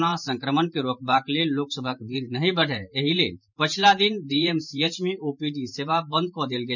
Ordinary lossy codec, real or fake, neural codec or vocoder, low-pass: none; fake; vocoder, 44.1 kHz, 128 mel bands every 512 samples, BigVGAN v2; 7.2 kHz